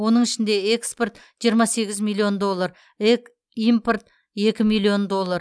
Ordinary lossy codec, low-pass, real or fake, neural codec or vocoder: none; none; real; none